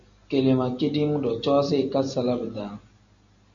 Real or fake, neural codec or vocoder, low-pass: real; none; 7.2 kHz